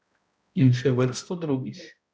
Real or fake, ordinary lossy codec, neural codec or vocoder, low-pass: fake; none; codec, 16 kHz, 0.5 kbps, X-Codec, HuBERT features, trained on balanced general audio; none